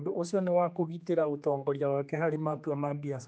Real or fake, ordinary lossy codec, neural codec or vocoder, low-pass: fake; none; codec, 16 kHz, 2 kbps, X-Codec, HuBERT features, trained on general audio; none